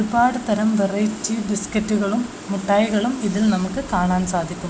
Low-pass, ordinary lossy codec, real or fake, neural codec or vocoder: none; none; real; none